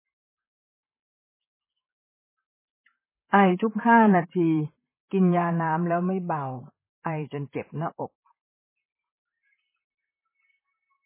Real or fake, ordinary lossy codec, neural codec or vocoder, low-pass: fake; MP3, 16 kbps; vocoder, 44.1 kHz, 128 mel bands, Pupu-Vocoder; 3.6 kHz